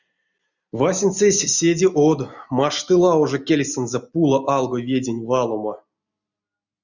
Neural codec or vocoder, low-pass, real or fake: none; 7.2 kHz; real